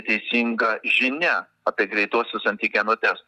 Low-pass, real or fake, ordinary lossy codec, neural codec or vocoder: 14.4 kHz; real; Opus, 64 kbps; none